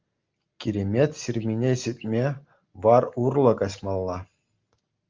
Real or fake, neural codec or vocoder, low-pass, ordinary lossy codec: real; none; 7.2 kHz; Opus, 24 kbps